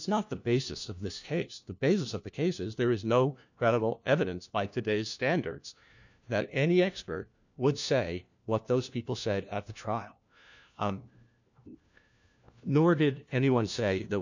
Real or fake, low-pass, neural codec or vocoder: fake; 7.2 kHz; codec, 16 kHz, 1 kbps, FunCodec, trained on LibriTTS, 50 frames a second